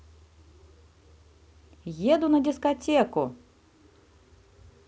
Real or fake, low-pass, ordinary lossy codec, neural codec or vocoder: real; none; none; none